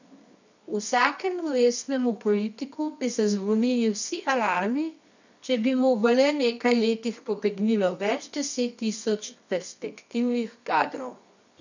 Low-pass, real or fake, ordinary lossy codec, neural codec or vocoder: 7.2 kHz; fake; none; codec, 24 kHz, 0.9 kbps, WavTokenizer, medium music audio release